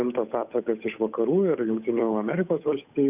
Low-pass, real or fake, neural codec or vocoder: 3.6 kHz; fake; codec, 16 kHz, 8 kbps, FunCodec, trained on Chinese and English, 25 frames a second